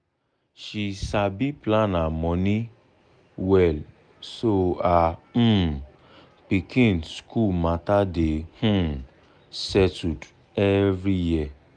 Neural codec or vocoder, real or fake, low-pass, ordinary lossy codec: none; real; 9.9 kHz; none